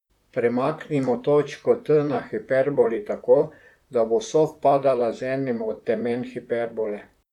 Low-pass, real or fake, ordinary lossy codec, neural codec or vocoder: 19.8 kHz; fake; none; vocoder, 44.1 kHz, 128 mel bands, Pupu-Vocoder